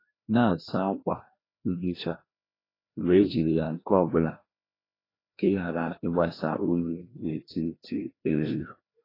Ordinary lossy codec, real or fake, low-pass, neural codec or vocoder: AAC, 24 kbps; fake; 5.4 kHz; codec, 16 kHz, 1 kbps, FreqCodec, larger model